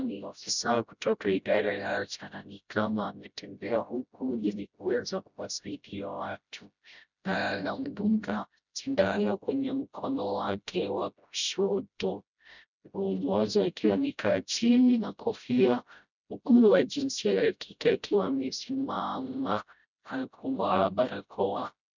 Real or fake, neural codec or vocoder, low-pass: fake; codec, 16 kHz, 0.5 kbps, FreqCodec, smaller model; 7.2 kHz